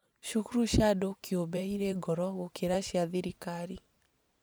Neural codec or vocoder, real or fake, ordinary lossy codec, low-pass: vocoder, 44.1 kHz, 128 mel bands every 512 samples, BigVGAN v2; fake; none; none